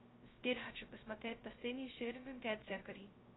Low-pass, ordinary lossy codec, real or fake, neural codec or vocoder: 7.2 kHz; AAC, 16 kbps; fake; codec, 16 kHz, 0.2 kbps, FocalCodec